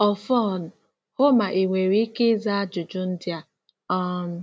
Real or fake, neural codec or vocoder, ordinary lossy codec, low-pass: real; none; none; none